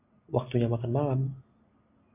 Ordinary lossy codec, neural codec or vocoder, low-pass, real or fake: AAC, 32 kbps; none; 3.6 kHz; real